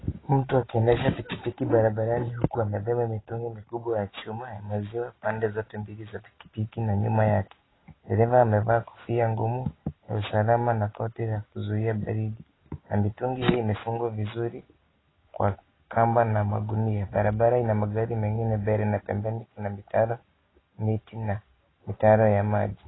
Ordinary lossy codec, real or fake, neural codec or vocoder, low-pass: AAC, 16 kbps; real; none; 7.2 kHz